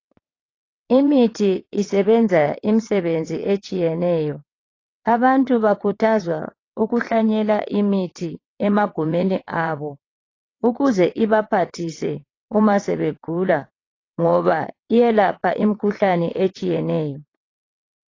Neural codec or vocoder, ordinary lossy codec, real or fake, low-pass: vocoder, 22.05 kHz, 80 mel bands, WaveNeXt; AAC, 32 kbps; fake; 7.2 kHz